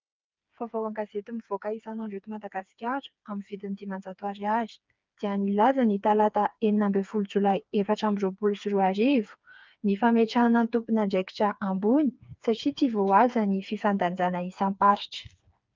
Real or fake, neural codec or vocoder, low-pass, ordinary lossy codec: fake; codec, 16 kHz, 4 kbps, FreqCodec, smaller model; 7.2 kHz; Opus, 24 kbps